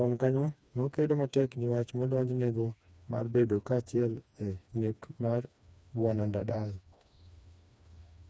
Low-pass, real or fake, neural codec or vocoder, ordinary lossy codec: none; fake; codec, 16 kHz, 2 kbps, FreqCodec, smaller model; none